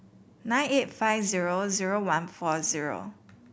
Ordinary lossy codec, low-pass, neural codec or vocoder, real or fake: none; none; none; real